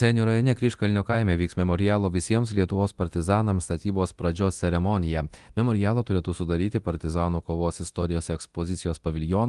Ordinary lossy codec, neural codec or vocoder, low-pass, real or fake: Opus, 24 kbps; codec, 24 kHz, 0.9 kbps, DualCodec; 10.8 kHz; fake